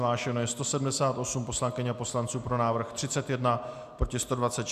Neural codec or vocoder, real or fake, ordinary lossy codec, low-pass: none; real; AAC, 96 kbps; 14.4 kHz